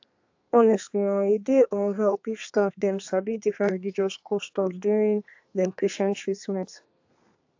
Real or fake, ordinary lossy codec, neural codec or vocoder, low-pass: fake; AAC, 48 kbps; codec, 32 kHz, 1.9 kbps, SNAC; 7.2 kHz